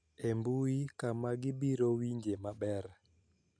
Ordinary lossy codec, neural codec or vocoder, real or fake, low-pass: none; none; real; 9.9 kHz